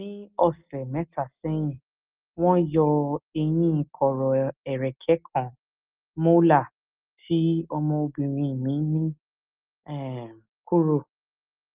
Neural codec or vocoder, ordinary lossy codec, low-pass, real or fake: none; Opus, 32 kbps; 3.6 kHz; real